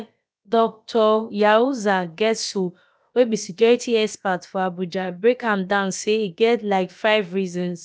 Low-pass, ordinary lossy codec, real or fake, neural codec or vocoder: none; none; fake; codec, 16 kHz, about 1 kbps, DyCAST, with the encoder's durations